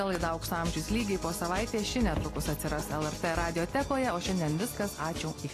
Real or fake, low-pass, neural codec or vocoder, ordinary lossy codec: real; 14.4 kHz; none; AAC, 48 kbps